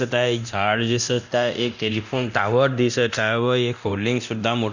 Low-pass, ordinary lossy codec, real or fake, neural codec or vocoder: 7.2 kHz; none; fake; codec, 24 kHz, 0.9 kbps, DualCodec